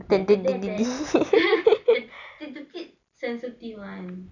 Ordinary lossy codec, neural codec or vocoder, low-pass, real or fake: none; none; 7.2 kHz; real